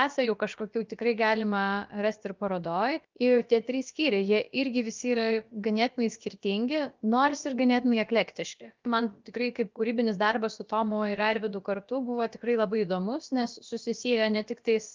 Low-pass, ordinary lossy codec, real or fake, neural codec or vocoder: 7.2 kHz; Opus, 24 kbps; fake; codec, 16 kHz, about 1 kbps, DyCAST, with the encoder's durations